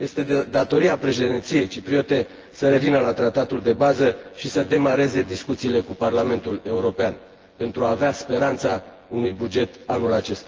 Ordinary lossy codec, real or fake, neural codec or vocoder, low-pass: Opus, 16 kbps; fake; vocoder, 24 kHz, 100 mel bands, Vocos; 7.2 kHz